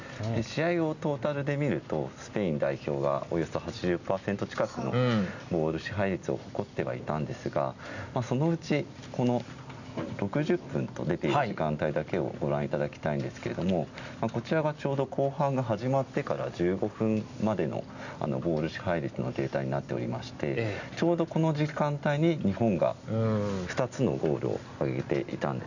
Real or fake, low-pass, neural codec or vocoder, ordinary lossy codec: real; 7.2 kHz; none; none